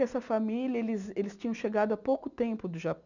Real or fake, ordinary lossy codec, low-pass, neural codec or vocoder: real; none; 7.2 kHz; none